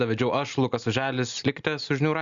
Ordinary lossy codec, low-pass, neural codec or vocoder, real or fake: Opus, 64 kbps; 7.2 kHz; none; real